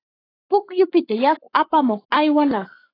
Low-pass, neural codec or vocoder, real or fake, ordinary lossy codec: 5.4 kHz; codec, 44.1 kHz, 7.8 kbps, Pupu-Codec; fake; AAC, 24 kbps